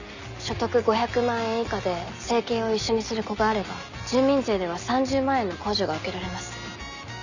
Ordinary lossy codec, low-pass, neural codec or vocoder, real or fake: none; 7.2 kHz; none; real